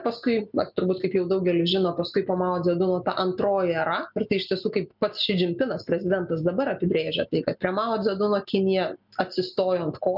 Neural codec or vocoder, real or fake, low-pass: none; real; 5.4 kHz